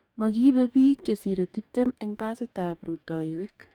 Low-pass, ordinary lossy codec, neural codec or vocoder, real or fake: 19.8 kHz; none; codec, 44.1 kHz, 2.6 kbps, DAC; fake